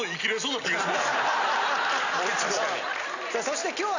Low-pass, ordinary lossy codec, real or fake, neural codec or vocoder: 7.2 kHz; none; real; none